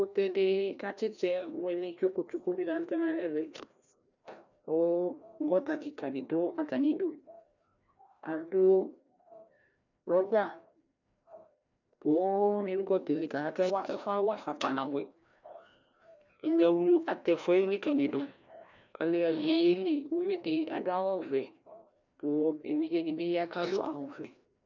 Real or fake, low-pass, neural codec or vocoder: fake; 7.2 kHz; codec, 16 kHz, 1 kbps, FreqCodec, larger model